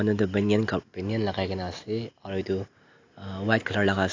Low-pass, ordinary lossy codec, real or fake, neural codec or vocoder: 7.2 kHz; AAC, 48 kbps; real; none